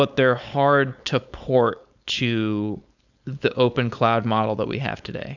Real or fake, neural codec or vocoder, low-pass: fake; codec, 16 kHz, 4.8 kbps, FACodec; 7.2 kHz